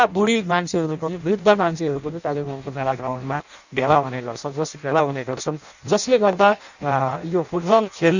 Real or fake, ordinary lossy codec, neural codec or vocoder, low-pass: fake; none; codec, 16 kHz in and 24 kHz out, 0.6 kbps, FireRedTTS-2 codec; 7.2 kHz